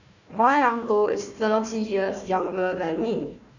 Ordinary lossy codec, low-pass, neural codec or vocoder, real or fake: none; 7.2 kHz; codec, 16 kHz, 1 kbps, FunCodec, trained on Chinese and English, 50 frames a second; fake